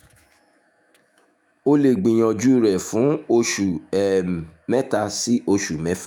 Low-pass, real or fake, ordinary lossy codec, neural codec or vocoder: 19.8 kHz; fake; none; autoencoder, 48 kHz, 128 numbers a frame, DAC-VAE, trained on Japanese speech